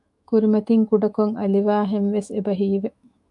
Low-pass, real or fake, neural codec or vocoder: 10.8 kHz; fake; autoencoder, 48 kHz, 128 numbers a frame, DAC-VAE, trained on Japanese speech